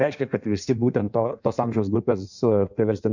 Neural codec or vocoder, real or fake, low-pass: codec, 16 kHz in and 24 kHz out, 1.1 kbps, FireRedTTS-2 codec; fake; 7.2 kHz